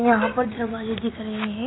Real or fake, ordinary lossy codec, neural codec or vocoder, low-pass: real; AAC, 16 kbps; none; 7.2 kHz